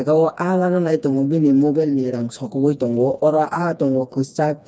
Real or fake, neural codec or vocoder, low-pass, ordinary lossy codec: fake; codec, 16 kHz, 2 kbps, FreqCodec, smaller model; none; none